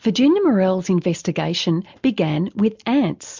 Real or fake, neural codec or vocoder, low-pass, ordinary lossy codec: real; none; 7.2 kHz; MP3, 64 kbps